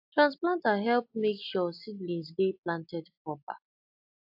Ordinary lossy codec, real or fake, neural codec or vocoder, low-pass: AAC, 48 kbps; real; none; 5.4 kHz